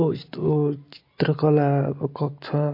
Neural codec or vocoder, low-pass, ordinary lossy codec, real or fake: none; 5.4 kHz; MP3, 32 kbps; real